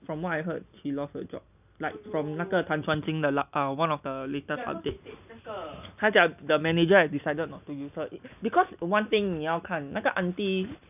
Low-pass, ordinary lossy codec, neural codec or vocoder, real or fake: 3.6 kHz; none; none; real